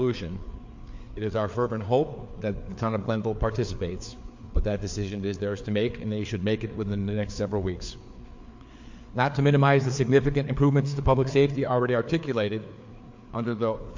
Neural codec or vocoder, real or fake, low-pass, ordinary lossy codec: codec, 16 kHz, 4 kbps, FreqCodec, larger model; fake; 7.2 kHz; MP3, 48 kbps